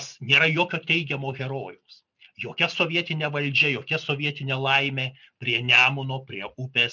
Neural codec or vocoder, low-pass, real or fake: none; 7.2 kHz; real